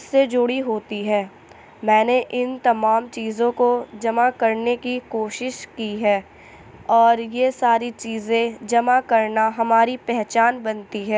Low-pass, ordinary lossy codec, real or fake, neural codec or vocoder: none; none; real; none